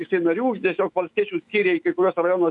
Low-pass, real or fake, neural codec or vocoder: 10.8 kHz; fake; autoencoder, 48 kHz, 128 numbers a frame, DAC-VAE, trained on Japanese speech